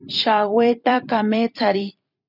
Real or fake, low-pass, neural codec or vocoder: fake; 5.4 kHz; vocoder, 44.1 kHz, 128 mel bands every 256 samples, BigVGAN v2